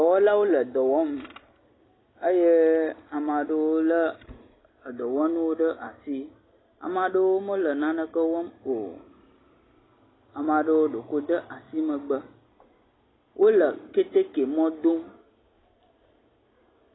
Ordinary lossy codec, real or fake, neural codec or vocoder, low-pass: AAC, 16 kbps; real; none; 7.2 kHz